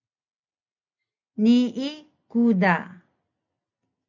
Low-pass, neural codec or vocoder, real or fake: 7.2 kHz; none; real